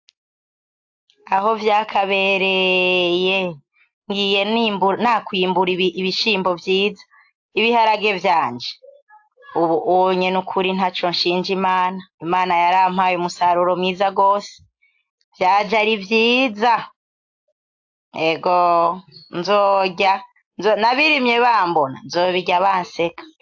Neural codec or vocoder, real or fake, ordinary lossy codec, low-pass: none; real; AAC, 48 kbps; 7.2 kHz